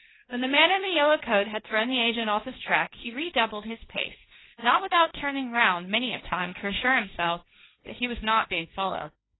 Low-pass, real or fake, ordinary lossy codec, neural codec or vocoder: 7.2 kHz; fake; AAC, 16 kbps; codec, 16 kHz, 1.1 kbps, Voila-Tokenizer